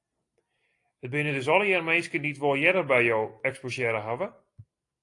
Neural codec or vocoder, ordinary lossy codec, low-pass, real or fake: none; AAC, 48 kbps; 10.8 kHz; real